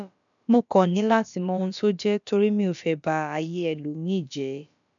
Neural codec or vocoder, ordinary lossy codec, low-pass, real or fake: codec, 16 kHz, about 1 kbps, DyCAST, with the encoder's durations; none; 7.2 kHz; fake